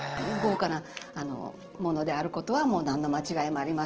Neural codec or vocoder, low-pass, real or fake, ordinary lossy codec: none; 7.2 kHz; real; Opus, 16 kbps